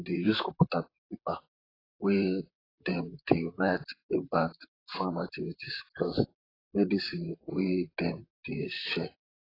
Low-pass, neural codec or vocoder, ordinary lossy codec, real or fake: 5.4 kHz; vocoder, 44.1 kHz, 128 mel bands, Pupu-Vocoder; AAC, 24 kbps; fake